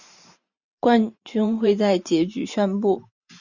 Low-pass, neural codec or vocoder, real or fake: 7.2 kHz; vocoder, 44.1 kHz, 128 mel bands every 512 samples, BigVGAN v2; fake